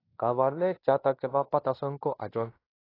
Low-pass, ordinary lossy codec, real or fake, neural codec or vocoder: 5.4 kHz; AAC, 24 kbps; fake; codec, 16 kHz in and 24 kHz out, 0.9 kbps, LongCat-Audio-Codec, fine tuned four codebook decoder